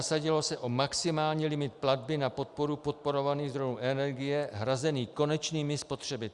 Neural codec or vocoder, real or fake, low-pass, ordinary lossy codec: none; real; 10.8 kHz; Opus, 64 kbps